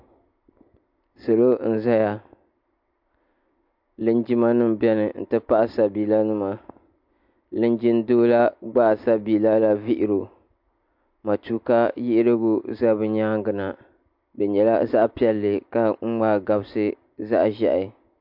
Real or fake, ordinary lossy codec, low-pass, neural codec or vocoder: real; MP3, 48 kbps; 5.4 kHz; none